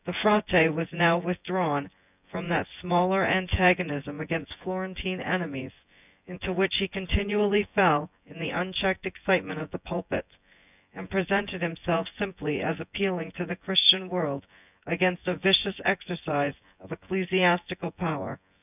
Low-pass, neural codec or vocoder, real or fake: 3.6 kHz; vocoder, 24 kHz, 100 mel bands, Vocos; fake